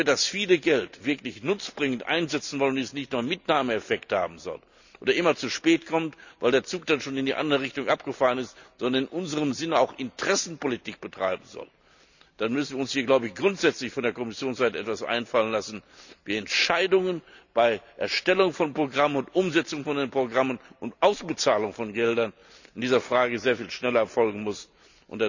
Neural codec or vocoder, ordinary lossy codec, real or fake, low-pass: none; none; real; 7.2 kHz